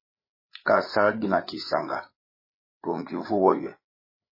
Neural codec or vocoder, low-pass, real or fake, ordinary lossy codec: codec, 16 kHz, 8 kbps, FreqCodec, larger model; 5.4 kHz; fake; MP3, 24 kbps